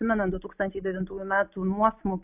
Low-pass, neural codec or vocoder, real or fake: 3.6 kHz; none; real